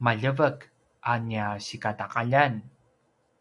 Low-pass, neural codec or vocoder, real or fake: 10.8 kHz; none; real